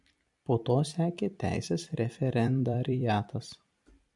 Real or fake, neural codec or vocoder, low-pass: fake; vocoder, 44.1 kHz, 128 mel bands every 256 samples, BigVGAN v2; 10.8 kHz